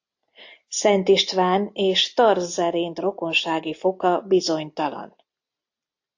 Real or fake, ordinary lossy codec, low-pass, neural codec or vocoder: real; AAC, 48 kbps; 7.2 kHz; none